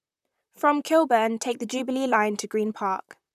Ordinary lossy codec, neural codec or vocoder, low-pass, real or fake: none; vocoder, 44.1 kHz, 128 mel bands, Pupu-Vocoder; 14.4 kHz; fake